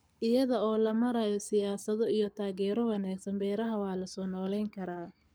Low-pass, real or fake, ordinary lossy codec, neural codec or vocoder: none; fake; none; codec, 44.1 kHz, 7.8 kbps, Pupu-Codec